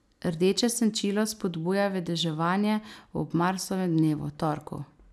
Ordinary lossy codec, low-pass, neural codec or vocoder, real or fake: none; none; none; real